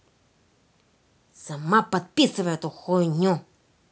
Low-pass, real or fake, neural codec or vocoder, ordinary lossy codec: none; real; none; none